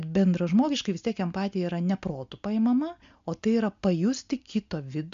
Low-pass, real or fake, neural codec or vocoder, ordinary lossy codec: 7.2 kHz; real; none; MP3, 64 kbps